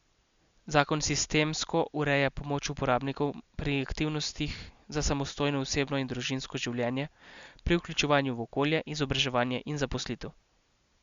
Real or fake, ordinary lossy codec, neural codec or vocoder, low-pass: real; Opus, 64 kbps; none; 7.2 kHz